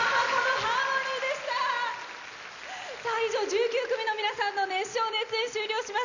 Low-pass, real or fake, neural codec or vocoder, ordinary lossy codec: 7.2 kHz; real; none; none